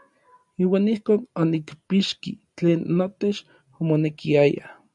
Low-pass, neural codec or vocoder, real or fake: 10.8 kHz; none; real